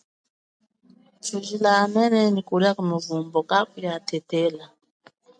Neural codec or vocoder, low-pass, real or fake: none; 9.9 kHz; real